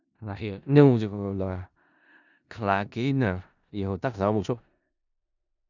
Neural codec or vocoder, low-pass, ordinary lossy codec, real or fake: codec, 16 kHz in and 24 kHz out, 0.4 kbps, LongCat-Audio-Codec, four codebook decoder; 7.2 kHz; none; fake